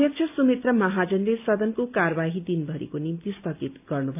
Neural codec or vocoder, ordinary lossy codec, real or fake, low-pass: none; AAC, 32 kbps; real; 3.6 kHz